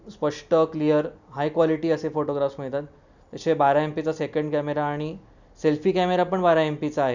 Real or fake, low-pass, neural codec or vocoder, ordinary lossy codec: real; 7.2 kHz; none; none